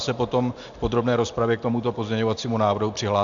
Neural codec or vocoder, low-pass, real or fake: none; 7.2 kHz; real